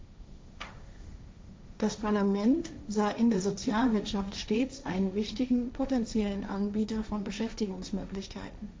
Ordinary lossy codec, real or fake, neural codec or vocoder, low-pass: none; fake; codec, 16 kHz, 1.1 kbps, Voila-Tokenizer; none